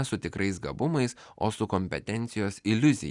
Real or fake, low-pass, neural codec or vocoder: real; 10.8 kHz; none